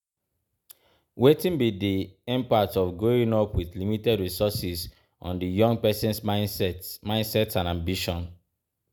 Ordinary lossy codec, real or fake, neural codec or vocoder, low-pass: none; real; none; none